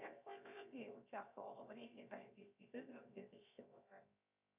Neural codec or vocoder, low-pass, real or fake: codec, 16 kHz, 0.7 kbps, FocalCodec; 3.6 kHz; fake